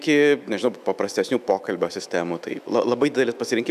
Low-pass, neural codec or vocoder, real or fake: 14.4 kHz; autoencoder, 48 kHz, 128 numbers a frame, DAC-VAE, trained on Japanese speech; fake